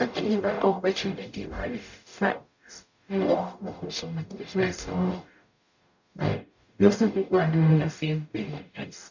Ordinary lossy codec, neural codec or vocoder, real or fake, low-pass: none; codec, 44.1 kHz, 0.9 kbps, DAC; fake; 7.2 kHz